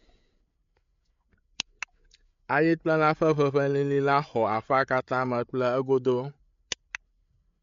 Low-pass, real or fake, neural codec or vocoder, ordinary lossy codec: 7.2 kHz; fake; codec, 16 kHz, 8 kbps, FreqCodec, larger model; MP3, 64 kbps